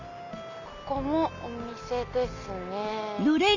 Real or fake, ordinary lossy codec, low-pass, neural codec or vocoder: real; none; 7.2 kHz; none